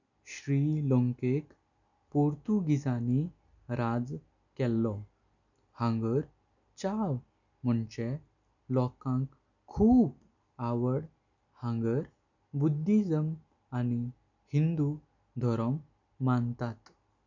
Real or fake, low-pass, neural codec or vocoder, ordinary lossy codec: real; 7.2 kHz; none; none